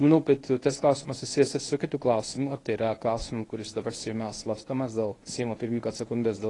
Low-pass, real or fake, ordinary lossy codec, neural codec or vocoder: 10.8 kHz; fake; AAC, 32 kbps; codec, 24 kHz, 0.9 kbps, WavTokenizer, medium speech release version 1